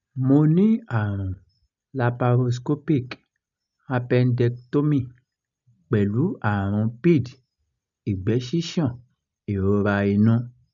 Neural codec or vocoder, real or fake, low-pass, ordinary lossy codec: none; real; 7.2 kHz; none